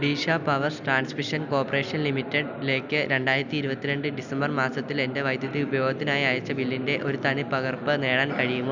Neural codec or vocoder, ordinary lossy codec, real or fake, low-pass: none; none; real; 7.2 kHz